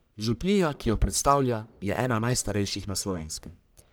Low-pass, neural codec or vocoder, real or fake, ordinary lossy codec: none; codec, 44.1 kHz, 1.7 kbps, Pupu-Codec; fake; none